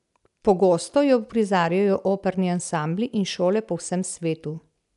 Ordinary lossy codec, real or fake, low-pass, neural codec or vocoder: none; real; 10.8 kHz; none